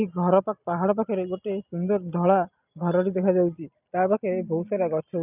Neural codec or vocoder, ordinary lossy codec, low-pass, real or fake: none; none; 3.6 kHz; real